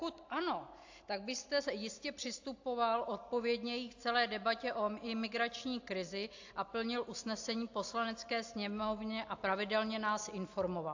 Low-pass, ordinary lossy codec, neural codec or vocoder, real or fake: 7.2 kHz; AAC, 48 kbps; none; real